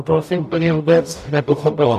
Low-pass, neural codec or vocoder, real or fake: 14.4 kHz; codec, 44.1 kHz, 0.9 kbps, DAC; fake